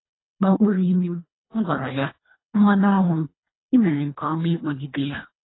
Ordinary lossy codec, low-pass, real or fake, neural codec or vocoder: AAC, 16 kbps; 7.2 kHz; fake; codec, 24 kHz, 1.5 kbps, HILCodec